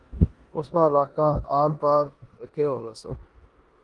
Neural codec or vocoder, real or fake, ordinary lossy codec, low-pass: codec, 16 kHz in and 24 kHz out, 0.9 kbps, LongCat-Audio-Codec, four codebook decoder; fake; Opus, 24 kbps; 10.8 kHz